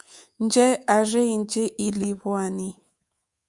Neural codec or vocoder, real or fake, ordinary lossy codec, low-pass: codec, 24 kHz, 3.1 kbps, DualCodec; fake; Opus, 64 kbps; 10.8 kHz